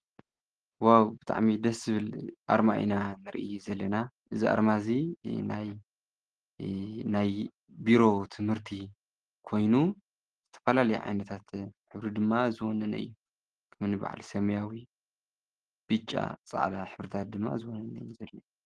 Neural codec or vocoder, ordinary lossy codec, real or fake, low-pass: none; Opus, 16 kbps; real; 9.9 kHz